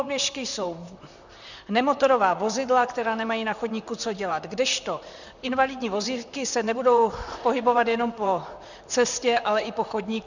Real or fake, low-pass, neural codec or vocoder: fake; 7.2 kHz; vocoder, 44.1 kHz, 128 mel bands, Pupu-Vocoder